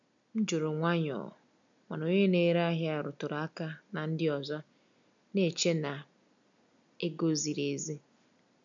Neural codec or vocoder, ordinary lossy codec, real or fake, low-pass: none; none; real; 7.2 kHz